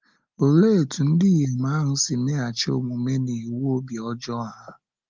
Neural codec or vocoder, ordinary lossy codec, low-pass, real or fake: none; Opus, 24 kbps; 7.2 kHz; real